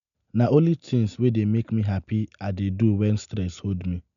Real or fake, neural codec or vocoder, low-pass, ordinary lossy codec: real; none; 7.2 kHz; none